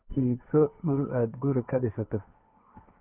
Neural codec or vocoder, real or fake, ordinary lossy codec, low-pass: codec, 16 kHz, 1.1 kbps, Voila-Tokenizer; fake; none; 3.6 kHz